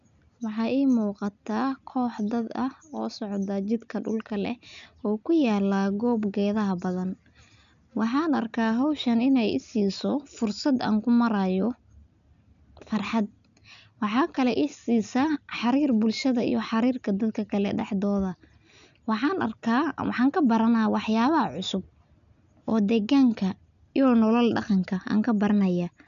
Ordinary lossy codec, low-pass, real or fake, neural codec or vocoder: none; 7.2 kHz; real; none